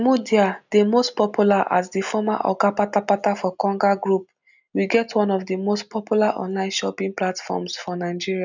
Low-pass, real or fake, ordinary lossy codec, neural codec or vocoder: 7.2 kHz; real; none; none